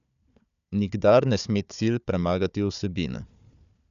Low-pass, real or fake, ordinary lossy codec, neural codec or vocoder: 7.2 kHz; fake; none; codec, 16 kHz, 4 kbps, FunCodec, trained on Chinese and English, 50 frames a second